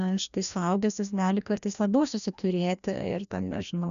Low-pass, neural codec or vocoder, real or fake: 7.2 kHz; codec, 16 kHz, 1 kbps, FreqCodec, larger model; fake